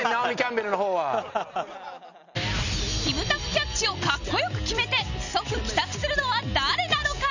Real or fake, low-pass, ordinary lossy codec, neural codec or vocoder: real; 7.2 kHz; none; none